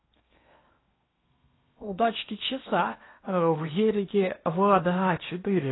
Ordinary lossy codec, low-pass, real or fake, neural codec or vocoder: AAC, 16 kbps; 7.2 kHz; fake; codec, 16 kHz in and 24 kHz out, 0.8 kbps, FocalCodec, streaming, 65536 codes